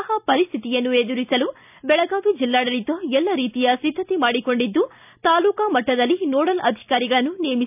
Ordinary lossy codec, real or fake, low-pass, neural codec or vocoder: none; real; 3.6 kHz; none